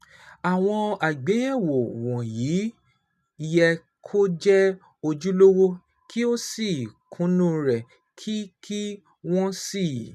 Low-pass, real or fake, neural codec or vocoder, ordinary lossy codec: 14.4 kHz; real; none; none